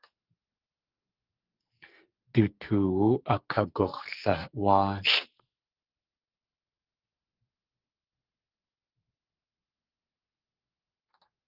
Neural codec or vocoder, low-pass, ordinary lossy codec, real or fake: codec, 44.1 kHz, 2.6 kbps, SNAC; 5.4 kHz; Opus, 32 kbps; fake